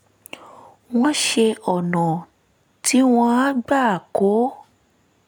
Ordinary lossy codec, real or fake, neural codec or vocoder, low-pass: none; real; none; none